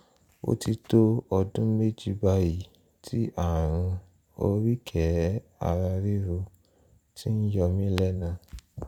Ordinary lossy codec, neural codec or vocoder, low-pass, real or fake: none; vocoder, 44.1 kHz, 128 mel bands every 256 samples, BigVGAN v2; 19.8 kHz; fake